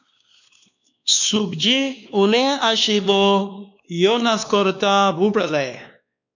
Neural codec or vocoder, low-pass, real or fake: codec, 16 kHz, 2 kbps, X-Codec, WavLM features, trained on Multilingual LibriSpeech; 7.2 kHz; fake